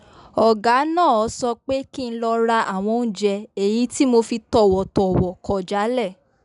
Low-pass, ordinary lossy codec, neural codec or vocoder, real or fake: 10.8 kHz; none; none; real